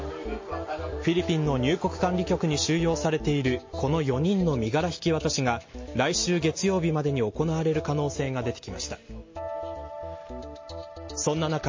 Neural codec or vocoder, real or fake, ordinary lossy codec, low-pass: none; real; MP3, 32 kbps; 7.2 kHz